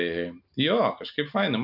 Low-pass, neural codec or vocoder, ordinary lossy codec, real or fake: 5.4 kHz; none; AAC, 48 kbps; real